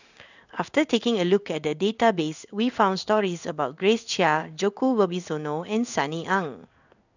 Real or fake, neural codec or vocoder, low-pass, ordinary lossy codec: fake; codec, 16 kHz in and 24 kHz out, 1 kbps, XY-Tokenizer; 7.2 kHz; none